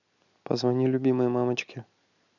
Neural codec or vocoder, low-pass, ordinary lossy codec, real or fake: none; 7.2 kHz; none; real